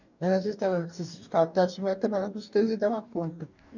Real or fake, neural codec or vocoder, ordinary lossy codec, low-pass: fake; codec, 44.1 kHz, 2.6 kbps, DAC; none; 7.2 kHz